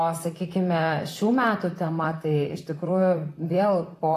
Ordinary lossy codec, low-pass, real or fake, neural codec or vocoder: AAC, 48 kbps; 14.4 kHz; fake; vocoder, 44.1 kHz, 128 mel bands, Pupu-Vocoder